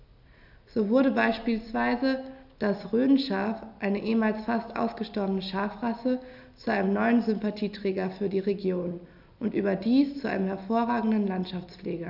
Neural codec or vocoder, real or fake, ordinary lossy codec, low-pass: none; real; AAC, 48 kbps; 5.4 kHz